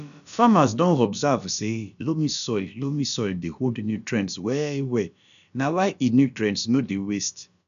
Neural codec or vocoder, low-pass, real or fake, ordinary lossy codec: codec, 16 kHz, about 1 kbps, DyCAST, with the encoder's durations; 7.2 kHz; fake; none